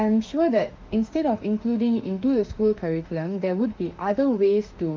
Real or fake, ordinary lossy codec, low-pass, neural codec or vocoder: fake; Opus, 32 kbps; 7.2 kHz; autoencoder, 48 kHz, 32 numbers a frame, DAC-VAE, trained on Japanese speech